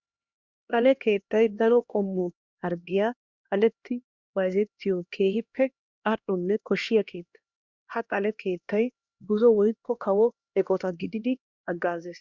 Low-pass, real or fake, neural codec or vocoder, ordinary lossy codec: 7.2 kHz; fake; codec, 16 kHz, 1 kbps, X-Codec, HuBERT features, trained on LibriSpeech; Opus, 64 kbps